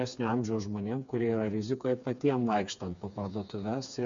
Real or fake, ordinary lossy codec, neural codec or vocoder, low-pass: fake; MP3, 64 kbps; codec, 16 kHz, 4 kbps, FreqCodec, smaller model; 7.2 kHz